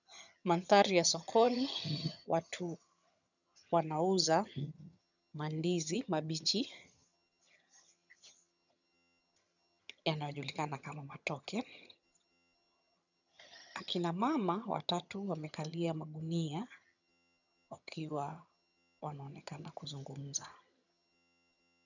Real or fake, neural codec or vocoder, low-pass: fake; vocoder, 22.05 kHz, 80 mel bands, HiFi-GAN; 7.2 kHz